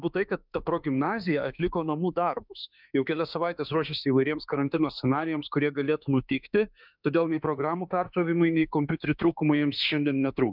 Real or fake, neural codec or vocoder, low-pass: fake; autoencoder, 48 kHz, 32 numbers a frame, DAC-VAE, trained on Japanese speech; 5.4 kHz